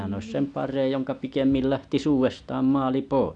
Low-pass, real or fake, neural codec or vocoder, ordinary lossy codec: 9.9 kHz; real; none; none